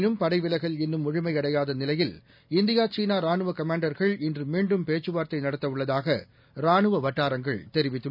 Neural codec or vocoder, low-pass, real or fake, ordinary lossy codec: none; 5.4 kHz; real; none